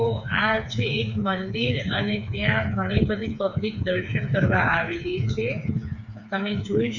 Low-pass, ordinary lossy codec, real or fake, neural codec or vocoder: 7.2 kHz; none; fake; codec, 16 kHz, 4 kbps, FreqCodec, smaller model